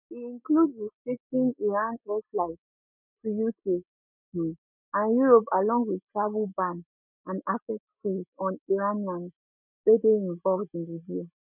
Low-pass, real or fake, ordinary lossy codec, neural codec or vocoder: 3.6 kHz; real; none; none